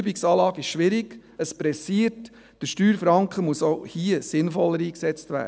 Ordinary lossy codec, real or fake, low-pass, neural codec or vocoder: none; real; none; none